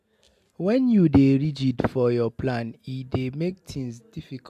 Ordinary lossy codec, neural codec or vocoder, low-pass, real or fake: AAC, 96 kbps; none; 14.4 kHz; real